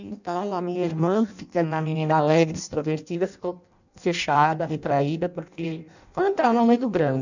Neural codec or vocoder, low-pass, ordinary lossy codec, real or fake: codec, 16 kHz in and 24 kHz out, 0.6 kbps, FireRedTTS-2 codec; 7.2 kHz; none; fake